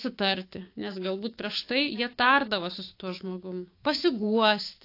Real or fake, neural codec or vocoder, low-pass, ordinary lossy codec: fake; codec, 16 kHz, 6 kbps, DAC; 5.4 kHz; AAC, 32 kbps